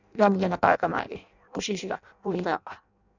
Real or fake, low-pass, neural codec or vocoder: fake; 7.2 kHz; codec, 16 kHz in and 24 kHz out, 0.6 kbps, FireRedTTS-2 codec